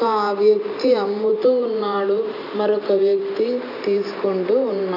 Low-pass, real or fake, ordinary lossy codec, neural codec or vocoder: 5.4 kHz; fake; none; vocoder, 44.1 kHz, 128 mel bands every 512 samples, BigVGAN v2